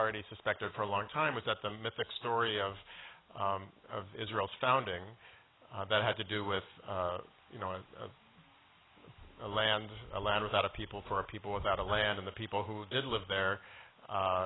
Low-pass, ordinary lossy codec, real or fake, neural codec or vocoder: 7.2 kHz; AAC, 16 kbps; real; none